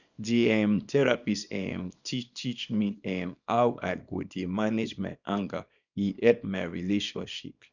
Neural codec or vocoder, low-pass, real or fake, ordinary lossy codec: codec, 24 kHz, 0.9 kbps, WavTokenizer, small release; 7.2 kHz; fake; none